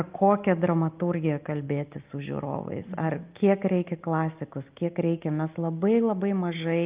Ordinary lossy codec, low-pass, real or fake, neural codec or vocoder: Opus, 32 kbps; 3.6 kHz; real; none